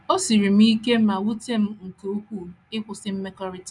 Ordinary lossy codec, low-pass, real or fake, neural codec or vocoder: none; 10.8 kHz; real; none